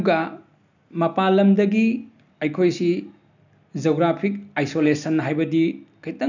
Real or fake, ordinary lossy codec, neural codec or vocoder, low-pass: real; none; none; 7.2 kHz